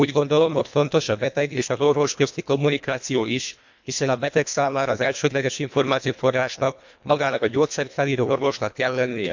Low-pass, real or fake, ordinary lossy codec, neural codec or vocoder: 7.2 kHz; fake; MP3, 64 kbps; codec, 24 kHz, 1.5 kbps, HILCodec